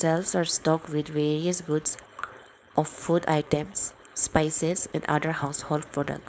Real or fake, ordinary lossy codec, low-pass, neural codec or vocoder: fake; none; none; codec, 16 kHz, 4.8 kbps, FACodec